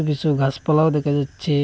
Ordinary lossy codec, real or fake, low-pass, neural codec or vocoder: none; real; none; none